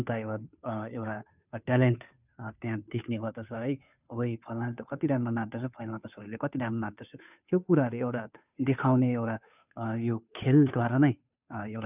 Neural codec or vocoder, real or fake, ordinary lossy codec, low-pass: codec, 16 kHz, 2 kbps, FunCodec, trained on Chinese and English, 25 frames a second; fake; none; 3.6 kHz